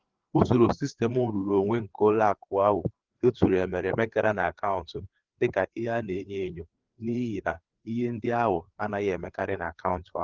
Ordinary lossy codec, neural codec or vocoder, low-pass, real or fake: Opus, 16 kbps; codec, 16 kHz, 4 kbps, FreqCodec, larger model; 7.2 kHz; fake